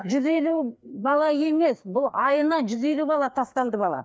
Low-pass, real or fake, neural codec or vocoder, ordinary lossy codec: none; fake; codec, 16 kHz, 2 kbps, FreqCodec, larger model; none